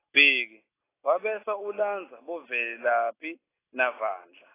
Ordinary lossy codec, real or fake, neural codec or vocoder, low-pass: AAC, 16 kbps; real; none; 3.6 kHz